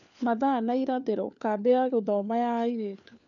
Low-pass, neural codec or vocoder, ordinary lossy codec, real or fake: 7.2 kHz; codec, 16 kHz, 4 kbps, FunCodec, trained on LibriTTS, 50 frames a second; none; fake